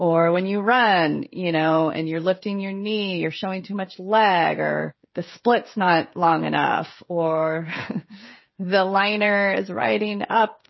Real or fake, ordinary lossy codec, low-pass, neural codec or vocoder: fake; MP3, 24 kbps; 7.2 kHz; codec, 16 kHz, 16 kbps, FreqCodec, smaller model